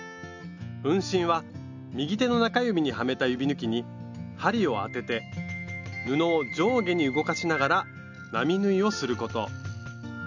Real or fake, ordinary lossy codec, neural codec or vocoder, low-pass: real; none; none; 7.2 kHz